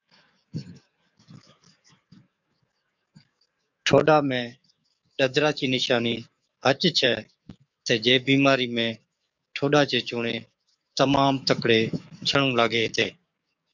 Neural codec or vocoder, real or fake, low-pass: codec, 44.1 kHz, 7.8 kbps, DAC; fake; 7.2 kHz